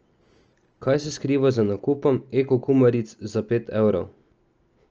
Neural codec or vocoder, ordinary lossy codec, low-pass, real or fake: none; Opus, 24 kbps; 7.2 kHz; real